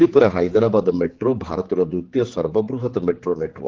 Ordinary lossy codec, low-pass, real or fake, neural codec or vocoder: Opus, 16 kbps; 7.2 kHz; fake; codec, 16 kHz, 4 kbps, X-Codec, HuBERT features, trained on general audio